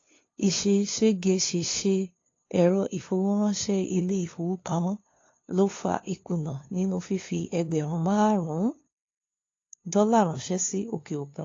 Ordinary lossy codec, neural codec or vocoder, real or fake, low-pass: AAC, 32 kbps; codec, 16 kHz, 2 kbps, FunCodec, trained on LibriTTS, 25 frames a second; fake; 7.2 kHz